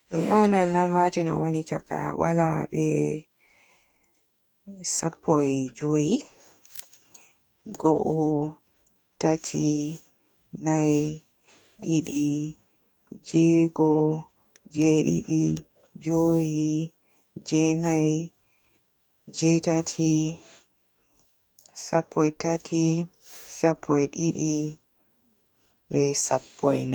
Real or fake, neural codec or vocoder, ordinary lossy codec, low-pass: fake; codec, 44.1 kHz, 2.6 kbps, DAC; none; none